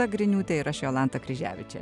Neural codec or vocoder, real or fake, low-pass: none; real; 10.8 kHz